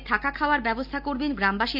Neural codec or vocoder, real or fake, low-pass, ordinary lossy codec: none; real; 5.4 kHz; none